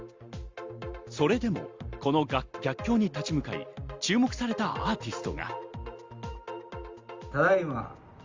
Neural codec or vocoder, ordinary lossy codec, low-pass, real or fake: none; Opus, 32 kbps; 7.2 kHz; real